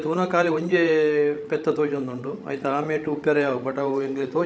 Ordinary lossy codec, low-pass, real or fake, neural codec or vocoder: none; none; fake; codec, 16 kHz, 16 kbps, FreqCodec, larger model